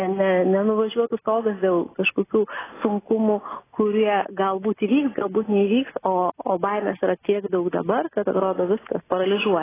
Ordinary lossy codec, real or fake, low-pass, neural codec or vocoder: AAC, 16 kbps; real; 3.6 kHz; none